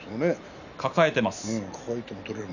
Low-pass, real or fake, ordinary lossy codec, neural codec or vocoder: 7.2 kHz; real; none; none